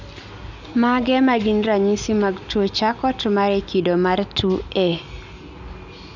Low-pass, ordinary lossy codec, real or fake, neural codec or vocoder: 7.2 kHz; none; real; none